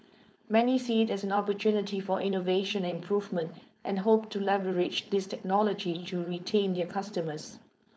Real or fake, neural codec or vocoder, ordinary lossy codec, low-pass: fake; codec, 16 kHz, 4.8 kbps, FACodec; none; none